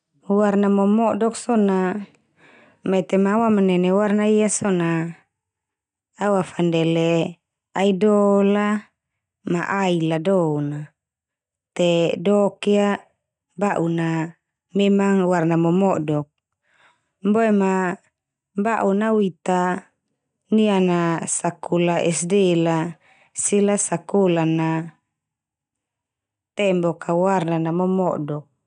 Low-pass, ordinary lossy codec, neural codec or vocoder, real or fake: 9.9 kHz; none; none; real